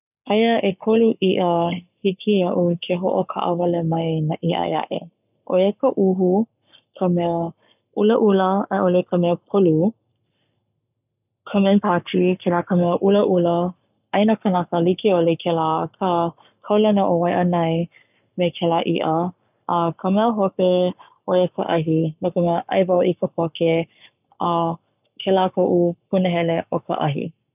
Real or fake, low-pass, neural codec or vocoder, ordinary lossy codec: fake; 3.6 kHz; codec, 44.1 kHz, 7.8 kbps, Pupu-Codec; none